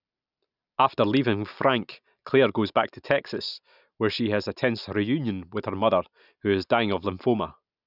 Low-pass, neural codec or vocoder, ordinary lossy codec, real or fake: 5.4 kHz; none; none; real